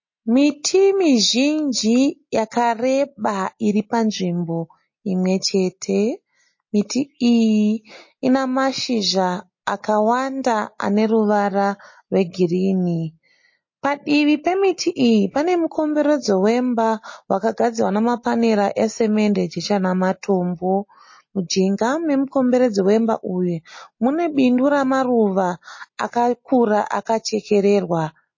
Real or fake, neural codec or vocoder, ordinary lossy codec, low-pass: real; none; MP3, 32 kbps; 7.2 kHz